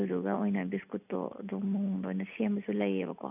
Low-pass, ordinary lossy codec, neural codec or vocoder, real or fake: 3.6 kHz; none; none; real